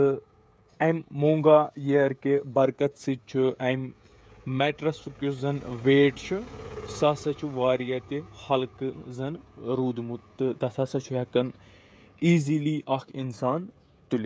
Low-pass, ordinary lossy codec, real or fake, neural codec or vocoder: none; none; fake; codec, 16 kHz, 16 kbps, FreqCodec, smaller model